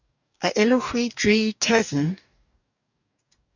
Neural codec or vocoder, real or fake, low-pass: codec, 44.1 kHz, 2.6 kbps, DAC; fake; 7.2 kHz